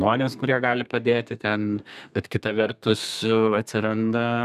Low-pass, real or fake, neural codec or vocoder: 14.4 kHz; fake; codec, 32 kHz, 1.9 kbps, SNAC